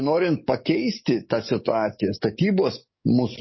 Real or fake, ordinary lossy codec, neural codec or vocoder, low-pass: fake; MP3, 24 kbps; codec, 44.1 kHz, 7.8 kbps, DAC; 7.2 kHz